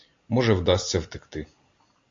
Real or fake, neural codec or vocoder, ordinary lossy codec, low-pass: real; none; AAC, 48 kbps; 7.2 kHz